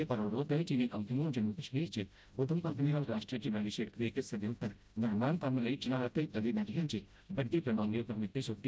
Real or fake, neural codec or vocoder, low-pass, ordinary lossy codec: fake; codec, 16 kHz, 0.5 kbps, FreqCodec, smaller model; none; none